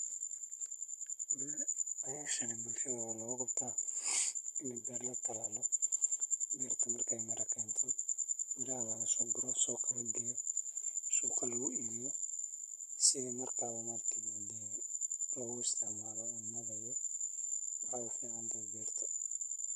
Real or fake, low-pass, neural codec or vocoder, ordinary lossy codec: fake; 14.4 kHz; vocoder, 44.1 kHz, 128 mel bands, Pupu-Vocoder; none